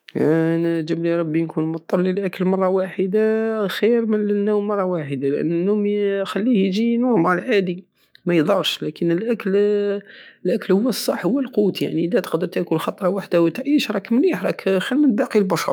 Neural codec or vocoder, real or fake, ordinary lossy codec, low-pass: autoencoder, 48 kHz, 128 numbers a frame, DAC-VAE, trained on Japanese speech; fake; none; none